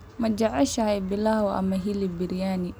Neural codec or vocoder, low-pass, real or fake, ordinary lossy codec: none; none; real; none